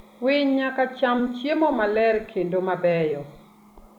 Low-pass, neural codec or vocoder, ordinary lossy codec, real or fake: 19.8 kHz; vocoder, 44.1 kHz, 128 mel bands every 256 samples, BigVGAN v2; none; fake